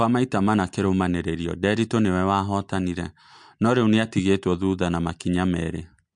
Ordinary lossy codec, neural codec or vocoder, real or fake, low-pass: MP3, 64 kbps; none; real; 9.9 kHz